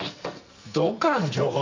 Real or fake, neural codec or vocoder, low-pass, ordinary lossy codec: fake; codec, 44.1 kHz, 2.6 kbps, SNAC; 7.2 kHz; MP3, 64 kbps